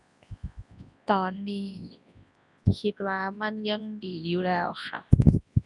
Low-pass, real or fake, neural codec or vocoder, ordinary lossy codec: 10.8 kHz; fake; codec, 24 kHz, 0.9 kbps, WavTokenizer, large speech release; none